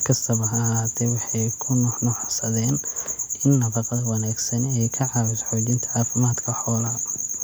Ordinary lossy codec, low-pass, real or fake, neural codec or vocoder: none; none; real; none